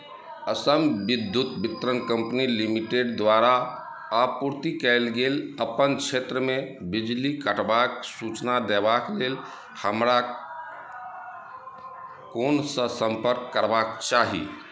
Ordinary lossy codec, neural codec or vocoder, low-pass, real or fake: none; none; none; real